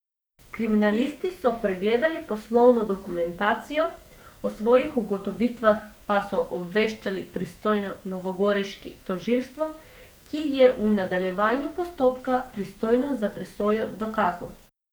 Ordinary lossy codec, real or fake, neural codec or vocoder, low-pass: none; fake; codec, 44.1 kHz, 2.6 kbps, SNAC; none